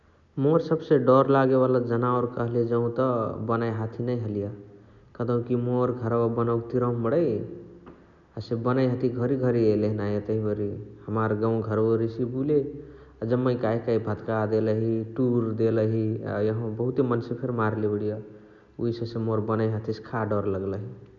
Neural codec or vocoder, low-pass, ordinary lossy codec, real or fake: none; 7.2 kHz; none; real